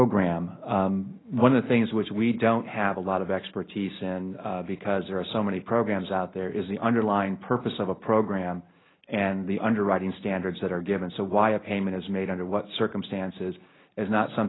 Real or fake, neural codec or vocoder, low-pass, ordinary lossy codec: real; none; 7.2 kHz; AAC, 16 kbps